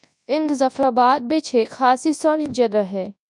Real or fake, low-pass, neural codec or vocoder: fake; 10.8 kHz; codec, 24 kHz, 0.9 kbps, WavTokenizer, large speech release